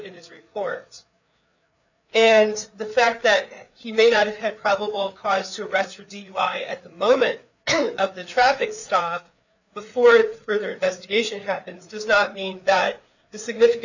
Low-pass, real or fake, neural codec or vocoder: 7.2 kHz; fake; codec, 16 kHz, 4 kbps, FreqCodec, larger model